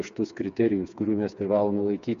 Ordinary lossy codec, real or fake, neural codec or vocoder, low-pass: MP3, 64 kbps; fake; codec, 16 kHz, 4 kbps, FreqCodec, smaller model; 7.2 kHz